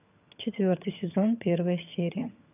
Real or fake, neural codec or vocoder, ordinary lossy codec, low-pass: fake; vocoder, 22.05 kHz, 80 mel bands, HiFi-GAN; none; 3.6 kHz